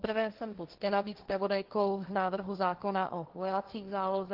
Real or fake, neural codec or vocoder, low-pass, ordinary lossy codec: fake; codec, 16 kHz, 1.1 kbps, Voila-Tokenizer; 5.4 kHz; Opus, 16 kbps